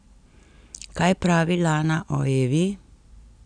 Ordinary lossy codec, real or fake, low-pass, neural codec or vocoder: none; real; 9.9 kHz; none